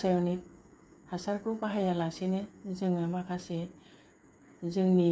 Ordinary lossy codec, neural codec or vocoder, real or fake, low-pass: none; codec, 16 kHz, 8 kbps, FreqCodec, smaller model; fake; none